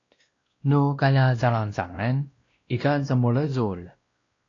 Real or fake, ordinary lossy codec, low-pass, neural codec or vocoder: fake; AAC, 32 kbps; 7.2 kHz; codec, 16 kHz, 1 kbps, X-Codec, WavLM features, trained on Multilingual LibriSpeech